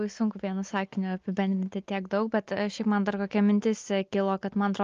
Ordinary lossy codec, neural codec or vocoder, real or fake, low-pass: Opus, 32 kbps; none; real; 7.2 kHz